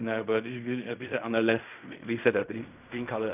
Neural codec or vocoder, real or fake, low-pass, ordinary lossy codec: codec, 16 kHz in and 24 kHz out, 0.4 kbps, LongCat-Audio-Codec, fine tuned four codebook decoder; fake; 3.6 kHz; none